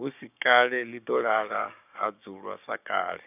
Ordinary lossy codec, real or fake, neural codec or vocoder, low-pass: none; fake; codec, 16 kHz, 6 kbps, DAC; 3.6 kHz